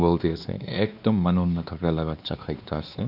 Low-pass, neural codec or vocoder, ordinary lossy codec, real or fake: 5.4 kHz; codec, 16 kHz, 2 kbps, X-Codec, WavLM features, trained on Multilingual LibriSpeech; AAC, 48 kbps; fake